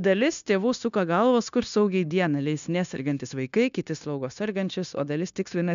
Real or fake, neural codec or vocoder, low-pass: fake; codec, 16 kHz, 0.9 kbps, LongCat-Audio-Codec; 7.2 kHz